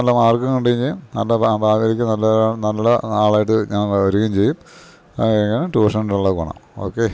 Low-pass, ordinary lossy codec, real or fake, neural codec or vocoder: none; none; real; none